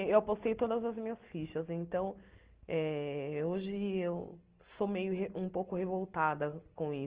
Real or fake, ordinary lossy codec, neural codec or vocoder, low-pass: real; Opus, 16 kbps; none; 3.6 kHz